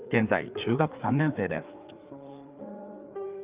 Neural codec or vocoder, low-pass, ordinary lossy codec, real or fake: codec, 16 kHz, 4 kbps, FreqCodec, larger model; 3.6 kHz; Opus, 24 kbps; fake